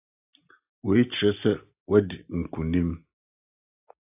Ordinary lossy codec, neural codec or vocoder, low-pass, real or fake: AAC, 32 kbps; none; 3.6 kHz; real